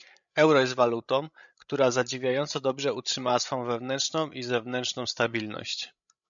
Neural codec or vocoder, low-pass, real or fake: codec, 16 kHz, 16 kbps, FreqCodec, larger model; 7.2 kHz; fake